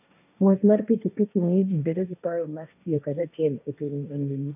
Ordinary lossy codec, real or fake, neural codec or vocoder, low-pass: none; fake; codec, 16 kHz, 1.1 kbps, Voila-Tokenizer; 3.6 kHz